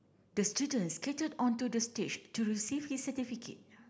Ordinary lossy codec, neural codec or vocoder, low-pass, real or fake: none; none; none; real